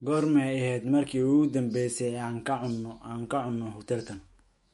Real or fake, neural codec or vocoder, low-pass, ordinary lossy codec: fake; autoencoder, 48 kHz, 128 numbers a frame, DAC-VAE, trained on Japanese speech; 19.8 kHz; MP3, 48 kbps